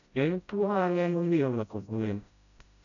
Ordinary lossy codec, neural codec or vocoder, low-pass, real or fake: none; codec, 16 kHz, 0.5 kbps, FreqCodec, smaller model; 7.2 kHz; fake